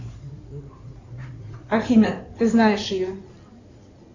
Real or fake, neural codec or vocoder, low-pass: fake; codec, 16 kHz in and 24 kHz out, 2.2 kbps, FireRedTTS-2 codec; 7.2 kHz